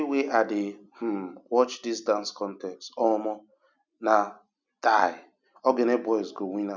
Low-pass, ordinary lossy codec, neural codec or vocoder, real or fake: 7.2 kHz; none; none; real